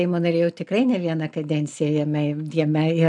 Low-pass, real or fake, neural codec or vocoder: 10.8 kHz; real; none